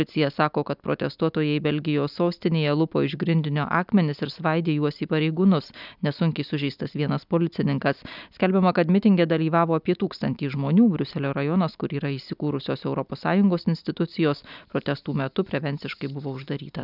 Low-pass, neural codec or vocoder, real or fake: 5.4 kHz; none; real